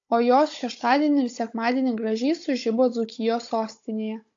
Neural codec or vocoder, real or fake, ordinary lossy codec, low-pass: codec, 16 kHz, 16 kbps, FunCodec, trained on Chinese and English, 50 frames a second; fake; AAC, 48 kbps; 7.2 kHz